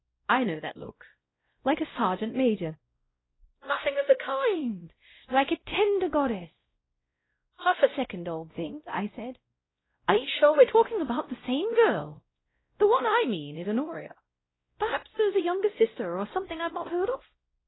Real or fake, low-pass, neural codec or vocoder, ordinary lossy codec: fake; 7.2 kHz; codec, 16 kHz, 0.5 kbps, X-Codec, WavLM features, trained on Multilingual LibriSpeech; AAC, 16 kbps